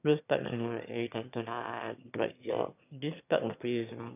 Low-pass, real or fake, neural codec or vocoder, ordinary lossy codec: 3.6 kHz; fake; autoencoder, 22.05 kHz, a latent of 192 numbers a frame, VITS, trained on one speaker; none